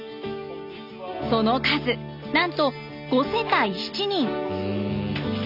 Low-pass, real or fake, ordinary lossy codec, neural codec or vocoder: 5.4 kHz; real; none; none